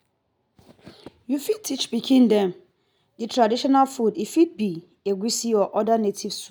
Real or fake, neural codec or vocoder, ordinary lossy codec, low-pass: real; none; none; none